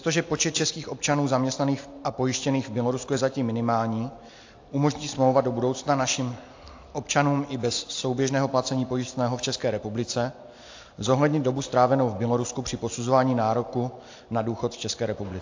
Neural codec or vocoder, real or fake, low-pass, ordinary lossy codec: none; real; 7.2 kHz; AAC, 48 kbps